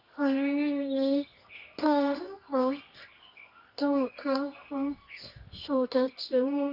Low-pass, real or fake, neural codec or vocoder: 5.4 kHz; fake; codec, 24 kHz, 0.9 kbps, WavTokenizer, medium music audio release